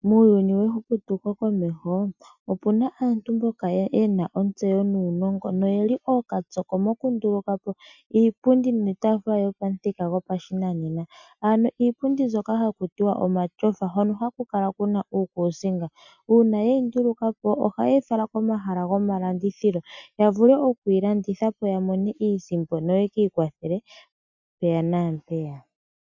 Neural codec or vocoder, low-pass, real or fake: none; 7.2 kHz; real